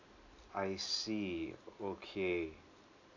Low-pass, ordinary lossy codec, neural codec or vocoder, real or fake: 7.2 kHz; none; none; real